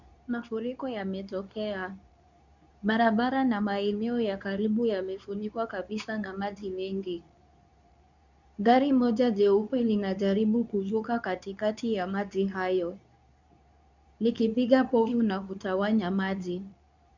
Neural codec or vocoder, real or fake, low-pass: codec, 24 kHz, 0.9 kbps, WavTokenizer, medium speech release version 2; fake; 7.2 kHz